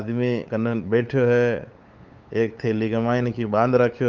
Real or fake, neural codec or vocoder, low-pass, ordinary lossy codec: fake; codec, 16 kHz, 8 kbps, FunCodec, trained on LibriTTS, 25 frames a second; 7.2 kHz; Opus, 24 kbps